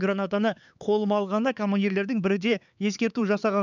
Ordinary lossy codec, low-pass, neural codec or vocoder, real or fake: none; 7.2 kHz; codec, 16 kHz, 4 kbps, X-Codec, HuBERT features, trained on LibriSpeech; fake